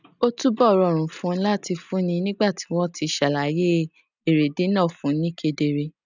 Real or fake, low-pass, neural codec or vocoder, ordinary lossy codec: real; 7.2 kHz; none; none